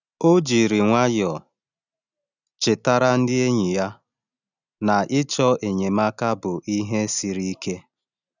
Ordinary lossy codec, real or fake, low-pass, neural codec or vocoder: none; real; 7.2 kHz; none